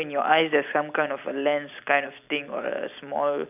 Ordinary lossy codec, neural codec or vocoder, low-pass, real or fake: none; none; 3.6 kHz; real